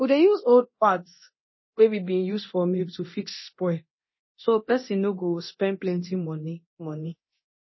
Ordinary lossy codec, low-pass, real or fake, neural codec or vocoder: MP3, 24 kbps; 7.2 kHz; fake; codec, 24 kHz, 0.9 kbps, DualCodec